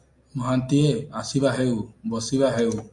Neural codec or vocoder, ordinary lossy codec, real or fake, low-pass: none; AAC, 64 kbps; real; 10.8 kHz